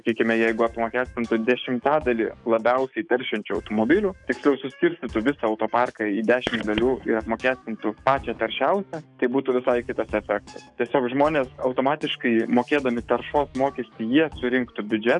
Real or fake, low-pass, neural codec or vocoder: fake; 10.8 kHz; codec, 44.1 kHz, 7.8 kbps, DAC